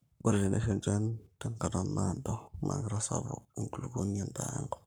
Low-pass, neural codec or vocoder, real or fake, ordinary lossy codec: none; codec, 44.1 kHz, 7.8 kbps, Pupu-Codec; fake; none